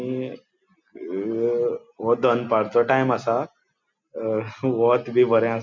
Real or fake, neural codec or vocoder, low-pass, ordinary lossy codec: real; none; 7.2 kHz; MP3, 64 kbps